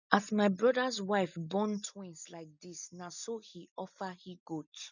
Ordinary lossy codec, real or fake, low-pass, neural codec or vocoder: none; real; 7.2 kHz; none